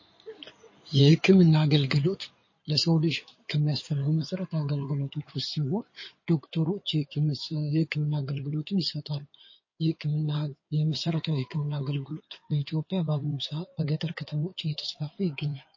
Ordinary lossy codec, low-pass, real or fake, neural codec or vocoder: MP3, 32 kbps; 7.2 kHz; fake; codec, 16 kHz in and 24 kHz out, 2.2 kbps, FireRedTTS-2 codec